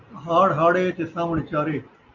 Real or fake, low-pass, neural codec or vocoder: real; 7.2 kHz; none